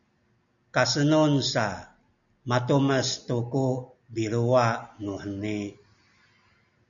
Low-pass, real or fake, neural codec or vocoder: 7.2 kHz; real; none